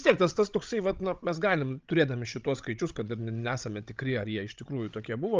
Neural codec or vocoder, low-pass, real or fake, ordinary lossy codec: codec, 16 kHz, 16 kbps, FreqCodec, larger model; 7.2 kHz; fake; Opus, 24 kbps